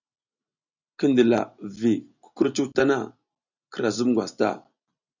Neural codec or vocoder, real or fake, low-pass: none; real; 7.2 kHz